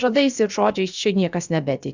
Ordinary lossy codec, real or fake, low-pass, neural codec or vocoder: Opus, 64 kbps; fake; 7.2 kHz; codec, 16 kHz, about 1 kbps, DyCAST, with the encoder's durations